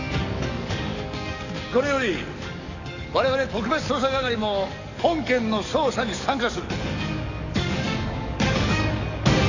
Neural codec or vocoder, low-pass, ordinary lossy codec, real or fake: codec, 44.1 kHz, 7.8 kbps, DAC; 7.2 kHz; none; fake